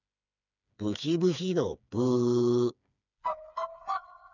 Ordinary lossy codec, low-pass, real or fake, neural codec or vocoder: none; 7.2 kHz; fake; codec, 16 kHz, 4 kbps, FreqCodec, smaller model